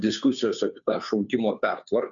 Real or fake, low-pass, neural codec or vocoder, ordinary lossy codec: fake; 7.2 kHz; codec, 16 kHz, 2 kbps, FunCodec, trained on Chinese and English, 25 frames a second; MP3, 96 kbps